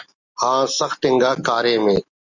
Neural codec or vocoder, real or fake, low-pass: none; real; 7.2 kHz